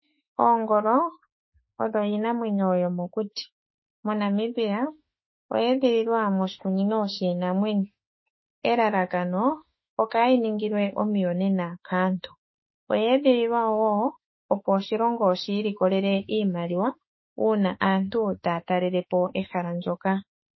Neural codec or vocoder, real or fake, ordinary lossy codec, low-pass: autoencoder, 48 kHz, 32 numbers a frame, DAC-VAE, trained on Japanese speech; fake; MP3, 24 kbps; 7.2 kHz